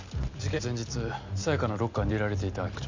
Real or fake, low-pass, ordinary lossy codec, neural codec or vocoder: real; 7.2 kHz; MP3, 64 kbps; none